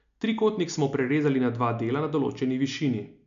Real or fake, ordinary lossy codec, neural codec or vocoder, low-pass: real; MP3, 96 kbps; none; 7.2 kHz